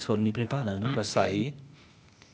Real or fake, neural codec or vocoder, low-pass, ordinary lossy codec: fake; codec, 16 kHz, 0.8 kbps, ZipCodec; none; none